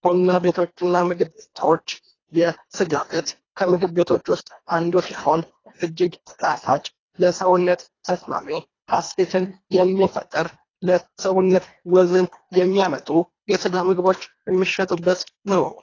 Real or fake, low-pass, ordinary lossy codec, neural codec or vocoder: fake; 7.2 kHz; AAC, 32 kbps; codec, 24 kHz, 1.5 kbps, HILCodec